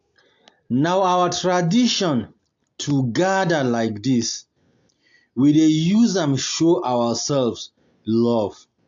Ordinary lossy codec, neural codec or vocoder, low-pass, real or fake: AAC, 64 kbps; none; 7.2 kHz; real